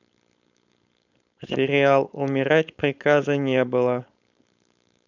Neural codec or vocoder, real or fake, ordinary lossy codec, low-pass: codec, 16 kHz, 4.8 kbps, FACodec; fake; none; 7.2 kHz